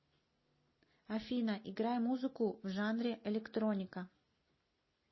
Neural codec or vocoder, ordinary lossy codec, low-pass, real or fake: none; MP3, 24 kbps; 7.2 kHz; real